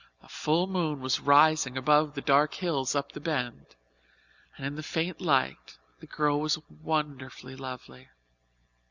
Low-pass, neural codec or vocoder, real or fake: 7.2 kHz; none; real